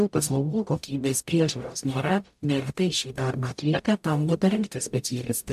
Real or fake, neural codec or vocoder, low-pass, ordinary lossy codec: fake; codec, 44.1 kHz, 0.9 kbps, DAC; 14.4 kHz; AAC, 96 kbps